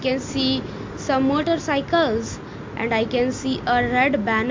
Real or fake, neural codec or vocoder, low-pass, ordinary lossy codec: real; none; 7.2 kHz; MP3, 48 kbps